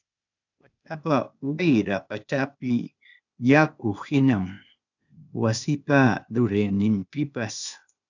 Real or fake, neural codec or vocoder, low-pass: fake; codec, 16 kHz, 0.8 kbps, ZipCodec; 7.2 kHz